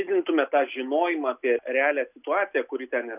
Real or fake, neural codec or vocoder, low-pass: real; none; 3.6 kHz